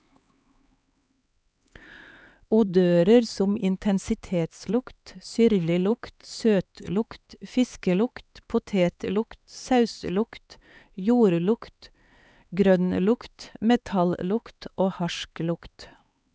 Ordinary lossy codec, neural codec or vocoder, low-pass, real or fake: none; codec, 16 kHz, 4 kbps, X-Codec, HuBERT features, trained on LibriSpeech; none; fake